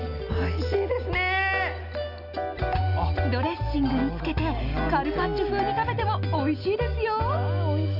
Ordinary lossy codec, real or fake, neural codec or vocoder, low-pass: none; real; none; 5.4 kHz